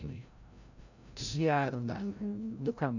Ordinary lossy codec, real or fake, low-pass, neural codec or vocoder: none; fake; 7.2 kHz; codec, 16 kHz, 0.5 kbps, FreqCodec, larger model